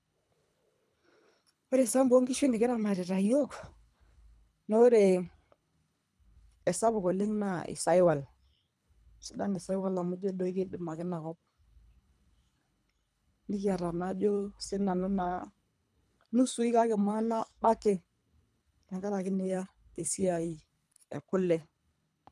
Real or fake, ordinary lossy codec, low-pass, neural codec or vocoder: fake; none; none; codec, 24 kHz, 3 kbps, HILCodec